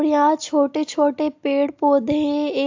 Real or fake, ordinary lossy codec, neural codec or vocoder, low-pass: real; AAC, 48 kbps; none; 7.2 kHz